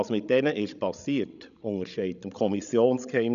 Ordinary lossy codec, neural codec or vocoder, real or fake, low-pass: none; codec, 16 kHz, 16 kbps, FreqCodec, larger model; fake; 7.2 kHz